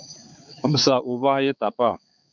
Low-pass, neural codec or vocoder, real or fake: 7.2 kHz; codec, 16 kHz, 4 kbps, X-Codec, HuBERT features, trained on balanced general audio; fake